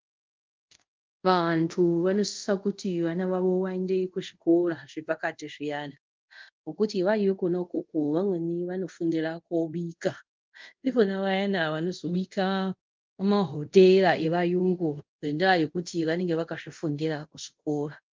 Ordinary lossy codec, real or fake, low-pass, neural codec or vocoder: Opus, 32 kbps; fake; 7.2 kHz; codec, 24 kHz, 0.5 kbps, DualCodec